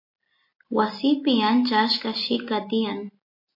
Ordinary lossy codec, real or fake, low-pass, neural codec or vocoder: MP3, 32 kbps; real; 5.4 kHz; none